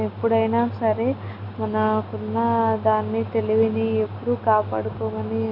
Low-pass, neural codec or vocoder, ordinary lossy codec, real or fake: 5.4 kHz; none; none; real